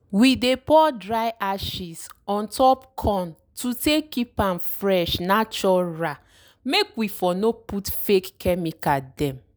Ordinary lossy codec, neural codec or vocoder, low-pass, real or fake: none; none; none; real